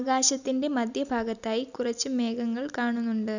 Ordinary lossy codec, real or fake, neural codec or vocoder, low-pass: none; real; none; 7.2 kHz